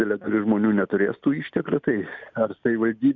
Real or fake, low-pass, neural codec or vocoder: real; 7.2 kHz; none